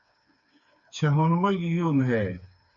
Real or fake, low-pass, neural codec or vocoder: fake; 7.2 kHz; codec, 16 kHz, 4 kbps, FreqCodec, smaller model